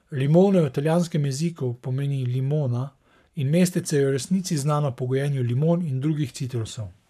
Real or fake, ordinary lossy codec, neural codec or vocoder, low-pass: fake; none; codec, 44.1 kHz, 7.8 kbps, Pupu-Codec; 14.4 kHz